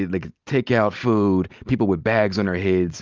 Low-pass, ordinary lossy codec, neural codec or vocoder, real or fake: 7.2 kHz; Opus, 32 kbps; none; real